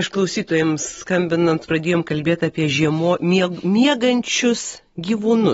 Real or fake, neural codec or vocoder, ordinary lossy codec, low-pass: real; none; AAC, 24 kbps; 19.8 kHz